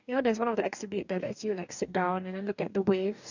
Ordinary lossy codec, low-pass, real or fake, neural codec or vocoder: none; 7.2 kHz; fake; codec, 44.1 kHz, 2.6 kbps, DAC